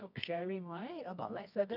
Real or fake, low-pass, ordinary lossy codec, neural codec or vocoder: fake; 5.4 kHz; none; codec, 24 kHz, 0.9 kbps, WavTokenizer, medium music audio release